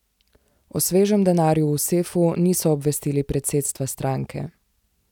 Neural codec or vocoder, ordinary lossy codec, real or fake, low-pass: none; none; real; 19.8 kHz